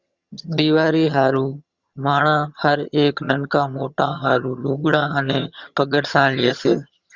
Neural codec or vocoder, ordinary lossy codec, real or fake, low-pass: vocoder, 22.05 kHz, 80 mel bands, HiFi-GAN; Opus, 64 kbps; fake; 7.2 kHz